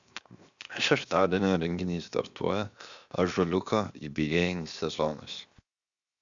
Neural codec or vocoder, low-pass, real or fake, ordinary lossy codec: codec, 16 kHz, 0.7 kbps, FocalCodec; 7.2 kHz; fake; Opus, 64 kbps